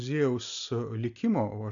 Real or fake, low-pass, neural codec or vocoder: real; 7.2 kHz; none